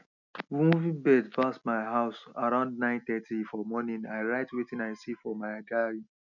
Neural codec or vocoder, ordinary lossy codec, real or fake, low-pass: none; none; real; 7.2 kHz